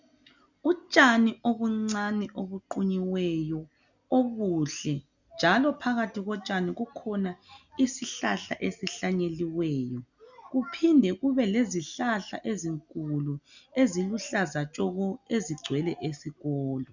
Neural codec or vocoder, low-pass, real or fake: none; 7.2 kHz; real